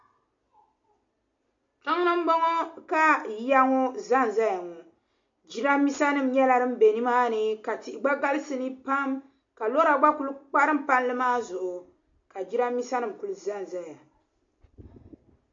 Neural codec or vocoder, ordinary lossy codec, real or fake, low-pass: none; MP3, 48 kbps; real; 7.2 kHz